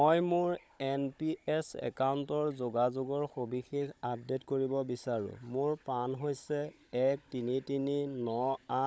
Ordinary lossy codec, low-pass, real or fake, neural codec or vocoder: none; none; fake; codec, 16 kHz, 16 kbps, FunCodec, trained on Chinese and English, 50 frames a second